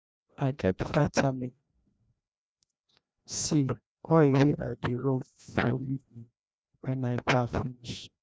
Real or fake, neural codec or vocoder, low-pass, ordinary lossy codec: fake; codec, 16 kHz, 1 kbps, FreqCodec, larger model; none; none